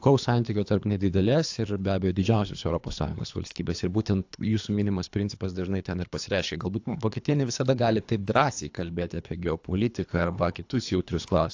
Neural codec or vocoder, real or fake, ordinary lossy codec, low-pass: codec, 24 kHz, 3 kbps, HILCodec; fake; AAC, 48 kbps; 7.2 kHz